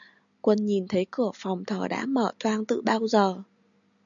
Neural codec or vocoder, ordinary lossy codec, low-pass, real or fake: none; MP3, 96 kbps; 7.2 kHz; real